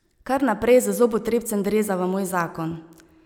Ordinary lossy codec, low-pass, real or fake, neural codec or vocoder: none; 19.8 kHz; real; none